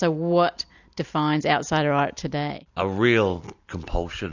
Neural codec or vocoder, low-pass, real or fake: none; 7.2 kHz; real